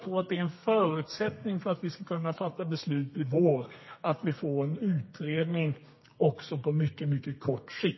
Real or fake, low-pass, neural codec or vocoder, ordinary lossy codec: fake; 7.2 kHz; codec, 32 kHz, 1.9 kbps, SNAC; MP3, 24 kbps